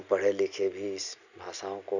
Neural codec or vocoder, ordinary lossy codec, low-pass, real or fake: none; none; 7.2 kHz; real